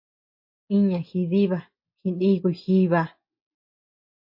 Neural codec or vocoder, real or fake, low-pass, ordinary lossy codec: none; real; 5.4 kHz; MP3, 32 kbps